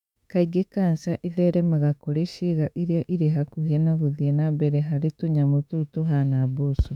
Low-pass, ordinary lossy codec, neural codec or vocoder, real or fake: 19.8 kHz; none; autoencoder, 48 kHz, 32 numbers a frame, DAC-VAE, trained on Japanese speech; fake